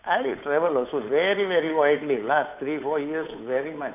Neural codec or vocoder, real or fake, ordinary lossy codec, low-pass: codec, 16 kHz, 8 kbps, FunCodec, trained on Chinese and English, 25 frames a second; fake; none; 3.6 kHz